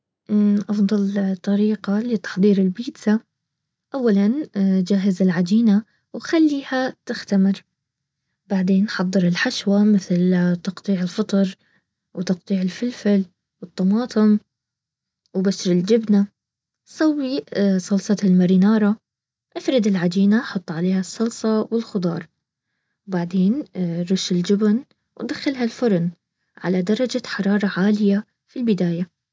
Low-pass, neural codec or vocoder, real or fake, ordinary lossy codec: none; none; real; none